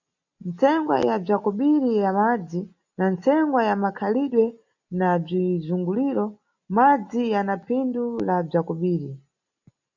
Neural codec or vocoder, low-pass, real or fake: none; 7.2 kHz; real